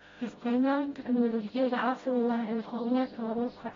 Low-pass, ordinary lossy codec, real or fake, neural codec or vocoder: 7.2 kHz; AAC, 24 kbps; fake; codec, 16 kHz, 0.5 kbps, FreqCodec, smaller model